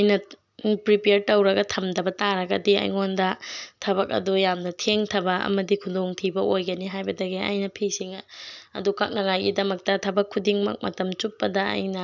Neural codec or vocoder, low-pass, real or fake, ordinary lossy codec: none; 7.2 kHz; real; none